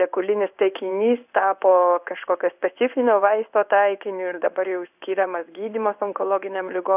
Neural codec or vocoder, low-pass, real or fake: codec, 16 kHz in and 24 kHz out, 1 kbps, XY-Tokenizer; 3.6 kHz; fake